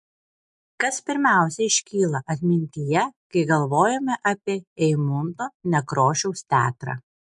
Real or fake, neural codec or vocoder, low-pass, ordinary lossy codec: real; none; 10.8 kHz; MP3, 64 kbps